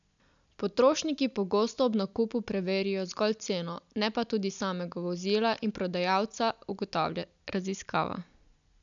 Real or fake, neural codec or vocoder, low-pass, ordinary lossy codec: real; none; 7.2 kHz; none